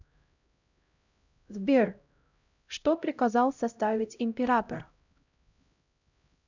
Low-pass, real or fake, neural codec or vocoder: 7.2 kHz; fake; codec, 16 kHz, 0.5 kbps, X-Codec, HuBERT features, trained on LibriSpeech